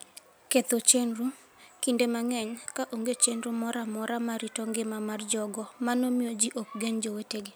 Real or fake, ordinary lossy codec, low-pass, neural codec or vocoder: real; none; none; none